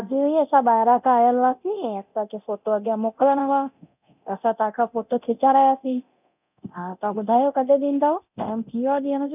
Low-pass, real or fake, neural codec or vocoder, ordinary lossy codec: 3.6 kHz; fake; codec, 24 kHz, 0.9 kbps, DualCodec; none